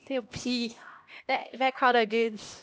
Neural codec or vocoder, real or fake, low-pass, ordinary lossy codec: codec, 16 kHz, 1 kbps, X-Codec, HuBERT features, trained on LibriSpeech; fake; none; none